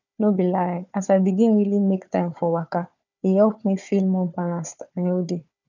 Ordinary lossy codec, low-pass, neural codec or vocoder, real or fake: none; 7.2 kHz; codec, 16 kHz, 4 kbps, FunCodec, trained on Chinese and English, 50 frames a second; fake